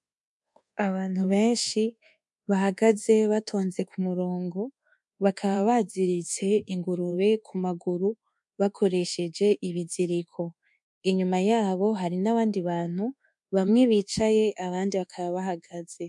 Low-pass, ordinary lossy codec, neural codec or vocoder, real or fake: 10.8 kHz; MP3, 64 kbps; codec, 24 kHz, 1.2 kbps, DualCodec; fake